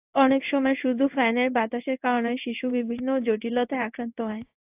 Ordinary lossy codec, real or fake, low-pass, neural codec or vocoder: AAC, 32 kbps; fake; 3.6 kHz; codec, 16 kHz in and 24 kHz out, 1 kbps, XY-Tokenizer